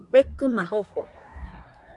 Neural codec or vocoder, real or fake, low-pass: codec, 24 kHz, 1 kbps, SNAC; fake; 10.8 kHz